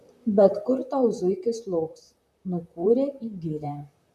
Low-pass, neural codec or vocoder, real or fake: 14.4 kHz; vocoder, 44.1 kHz, 128 mel bands, Pupu-Vocoder; fake